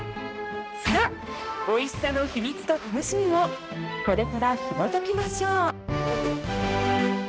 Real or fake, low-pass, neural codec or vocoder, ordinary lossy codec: fake; none; codec, 16 kHz, 1 kbps, X-Codec, HuBERT features, trained on balanced general audio; none